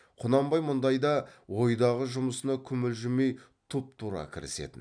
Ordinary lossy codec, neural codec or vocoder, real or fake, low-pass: none; none; real; 9.9 kHz